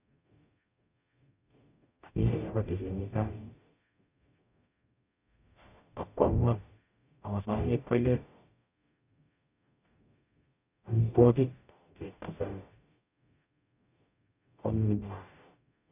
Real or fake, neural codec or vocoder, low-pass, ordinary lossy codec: fake; codec, 44.1 kHz, 0.9 kbps, DAC; 3.6 kHz; none